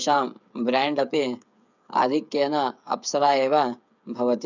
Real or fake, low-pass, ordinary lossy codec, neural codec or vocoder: fake; 7.2 kHz; none; codec, 16 kHz, 8 kbps, FreqCodec, smaller model